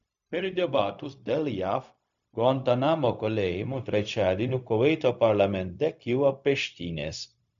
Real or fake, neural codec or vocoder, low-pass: fake; codec, 16 kHz, 0.4 kbps, LongCat-Audio-Codec; 7.2 kHz